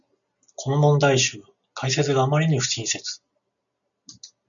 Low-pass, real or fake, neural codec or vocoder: 7.2 kHz; real; none